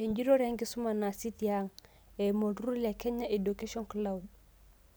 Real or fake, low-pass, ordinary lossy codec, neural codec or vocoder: real; none; none; none